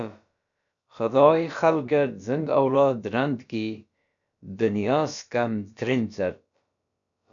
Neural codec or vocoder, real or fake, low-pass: codec, 16 kHz, about 1 kbps, DyCAST, with the encoder's durations; fake; 7.2 kHz